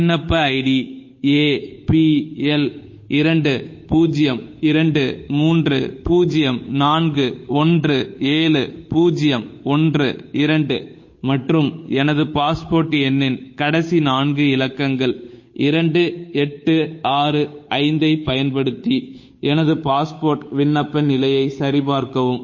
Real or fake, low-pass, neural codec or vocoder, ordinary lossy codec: fake; 7.2 kHz; codec, 16 kHz, 8 kbps, FunCodec, trained on Chinese and English, 25 frames a second; MP3, 32 kbps